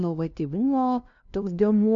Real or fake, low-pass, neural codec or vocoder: fake; 7.2 kHz; codec, 16 kHz, 0.5 kbps, FunCodec, trained on LibriTTS, 25 frames a second